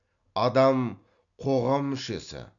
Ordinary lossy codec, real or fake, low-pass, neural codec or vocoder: none; real; 7.2 kHz; none